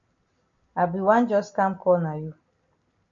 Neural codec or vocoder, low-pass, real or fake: none; 7.2 kHz; real